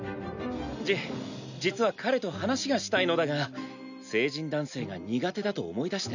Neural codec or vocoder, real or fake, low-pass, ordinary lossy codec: none; real; 7.2 kHz; none